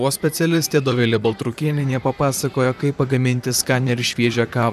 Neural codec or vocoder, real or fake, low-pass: vocoder, 44.1 kHz, 128 mel bands, Pupu-Vocoder; fake; 14.4 kHz